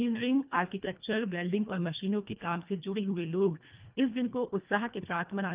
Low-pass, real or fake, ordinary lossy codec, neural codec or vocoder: 3.6 kHz; fake; Opus, 24 kbps; codec, 24 kHz, 1.5 kbps, HILCodec